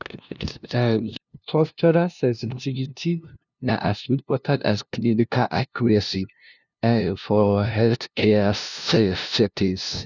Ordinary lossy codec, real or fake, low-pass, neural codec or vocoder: none; fake; 7.2 kHz; codec, 16 kHz, 0.5 kbps, FunCodec, trained on LibriTTS, 25 frames a second